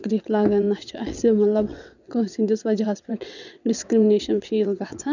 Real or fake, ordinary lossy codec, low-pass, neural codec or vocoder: fake; none; 7.2 kHz; vocoder, 22.05 kHz, 80 mel bands, WaveNeXt